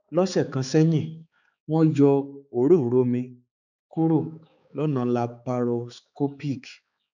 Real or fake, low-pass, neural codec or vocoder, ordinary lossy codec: fake; 7.2 kHz; codec, 16 kHz, 4 kbps, X-Codec, HuBERT features, trained on balanced general audio; none